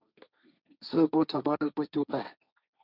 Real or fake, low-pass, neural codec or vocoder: fake; 5.4 kHz; codec, 16 kHz, 1.1 kbps, Voila-Tokenizer